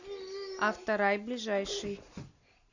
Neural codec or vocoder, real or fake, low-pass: none; real; 7.2 kHz